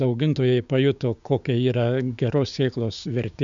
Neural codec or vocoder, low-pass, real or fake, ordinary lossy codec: codec, 16 kHz, 6 kbps, DAC; 7.2 kHz; fake; MP3, 64 kbps